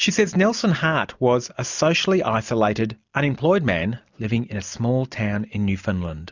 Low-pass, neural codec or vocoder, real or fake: 7.2 kHz; none; real